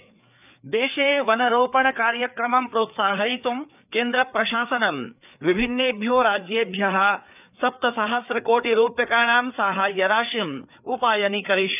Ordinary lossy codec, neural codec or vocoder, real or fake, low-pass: none; codec, 16 kHz, 4 kbps, FreqCodec, larger model; fake; 3.6 kHz